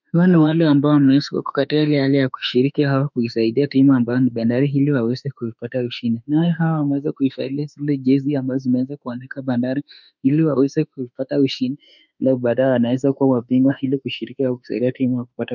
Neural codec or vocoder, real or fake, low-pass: autoencoder, 48 kHz, 32 numbers a frame, DAC-VAE, trained on Japanese speech; fake; 7.2 kHz